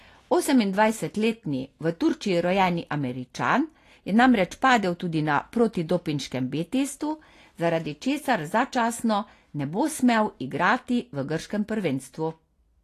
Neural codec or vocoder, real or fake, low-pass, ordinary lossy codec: none; real; 14.4 kHz; AAC, 48 kbps